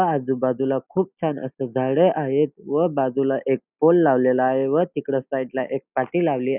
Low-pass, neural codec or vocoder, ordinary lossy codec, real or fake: 3.6 kHz; none; none; real